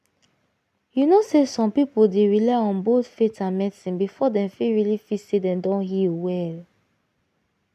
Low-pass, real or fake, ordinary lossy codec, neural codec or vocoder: 14.4 kHz; real; none; none